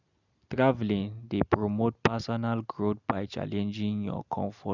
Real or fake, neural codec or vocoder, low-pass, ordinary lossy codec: real; none; 7.2 kHz; none